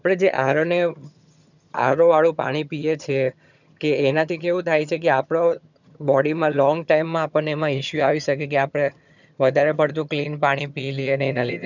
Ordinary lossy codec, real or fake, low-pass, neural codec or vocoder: none; fake; 7.2 kHz; vocoder, 22.05 kHz, 80 mel bands, HiFi-GAN